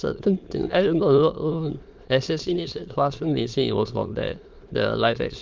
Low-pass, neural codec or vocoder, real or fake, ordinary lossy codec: 7.2 kHz; autoencoder, 22.05 kHz, a latent of 192 numbers a frame, VITS, trained on many speakers; fake; Opus, 32 kbps